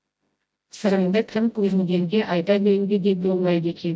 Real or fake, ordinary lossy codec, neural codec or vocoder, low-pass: fake; none; codec, 16 kHz, 0.5 kbps, FreqCodec, smaller model; none